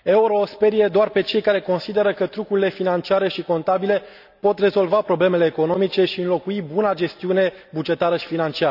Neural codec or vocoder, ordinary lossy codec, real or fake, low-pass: none; none; real; 5.4 kHz